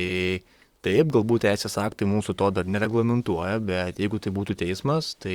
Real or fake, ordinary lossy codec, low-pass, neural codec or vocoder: fake; Opus, 64 kbps; 19.8 kHz; vocoder, 44.1 kHz, 128 mel bands, Pupu-Vocoder